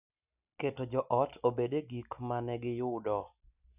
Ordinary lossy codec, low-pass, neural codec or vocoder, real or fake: none; 3.6 kHz; none; real